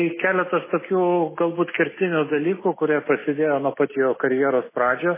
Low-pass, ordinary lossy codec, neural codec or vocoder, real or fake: 3.6 kHz; MP3, 16 kbps; none; real